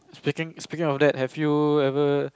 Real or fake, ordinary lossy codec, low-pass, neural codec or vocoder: real; none; none; none